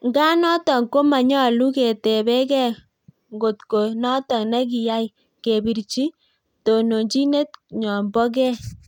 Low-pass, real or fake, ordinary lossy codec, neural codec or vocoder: 19.8 kHz; real; none; none